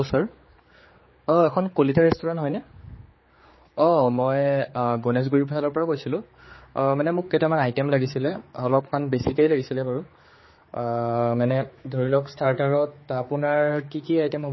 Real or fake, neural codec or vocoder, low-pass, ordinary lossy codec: fake; codec, 16 kHz, 4 kbps, X-Codec, HuBERT features, trained on general audio; 7.2 kHz; MP3, 24 kbps